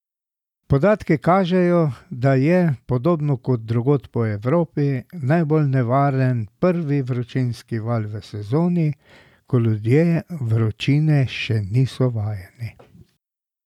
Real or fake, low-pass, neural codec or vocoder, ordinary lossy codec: real; 19.8 kHz; none; none